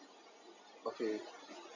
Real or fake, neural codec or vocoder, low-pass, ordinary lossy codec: real; none; 7.2 kHz; none